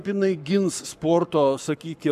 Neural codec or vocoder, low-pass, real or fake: codec, 44.1 kHz, 7.8 kbps, Pupu-Codec; 14.4 kHz; fake